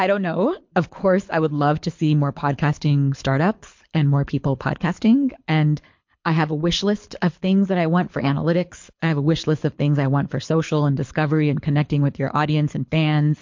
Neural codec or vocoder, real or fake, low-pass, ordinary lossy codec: codec, 24 kHz, 6 kbps, HILCodec; fake; 7.2 kHz; MP3, 48 kbps